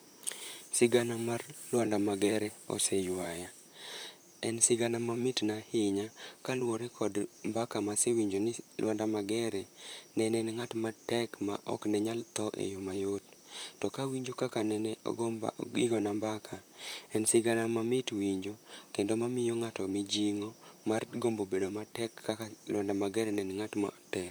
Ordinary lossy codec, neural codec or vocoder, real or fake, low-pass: none; vocoder, 44.1 kHz, 128 mel bands, Pupu-Vocoder; fake; none